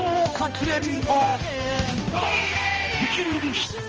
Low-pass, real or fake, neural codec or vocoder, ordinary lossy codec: 7.2 kHz; fake; codec, 16 kHz, 1 kbps, X-Codec, HuBERT features, trained on balanced general audio; Opus, 24 kbps